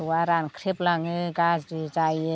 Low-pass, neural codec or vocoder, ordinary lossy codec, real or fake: none; none; none; real